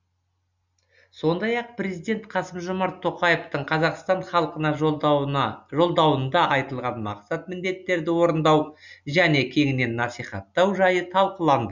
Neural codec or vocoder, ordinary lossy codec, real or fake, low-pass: none; none; real; 7.2 kHz